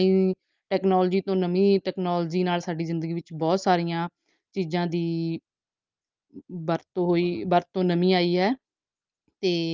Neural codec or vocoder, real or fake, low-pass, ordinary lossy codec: none; real; 7.2 kHz; Opus, 32 kbps